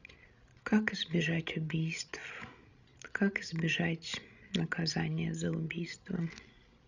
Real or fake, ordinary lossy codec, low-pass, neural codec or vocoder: fake; none; 7.2 kHz; codec, 16 kHz, 16 kbps, FreqCodec, larger model